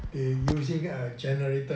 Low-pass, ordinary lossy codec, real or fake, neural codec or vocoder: none; none; real; none